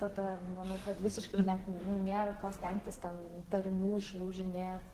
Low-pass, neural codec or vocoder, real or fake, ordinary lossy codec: 14.4 kHz; codec, 32 kHz, 1.9 kbps, SNAC; fake; Opus, 16 kbps